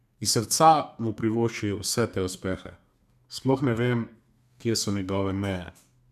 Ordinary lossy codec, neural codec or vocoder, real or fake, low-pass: none; codec, 32 kHz, 1.9 kbps, SNAC; fake; 14.4 kHz